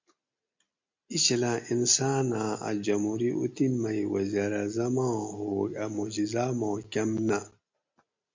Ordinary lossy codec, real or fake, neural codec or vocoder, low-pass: MP3, 64 kbps; real; none; 7.2 kHz